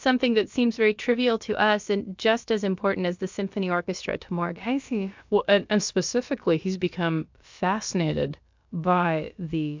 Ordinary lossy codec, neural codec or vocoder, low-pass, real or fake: MP3, 64 kbps; codec, 16 kHz, about 1 kbps, DyCAST, with the encoder's durations; 7.2 kHz; fake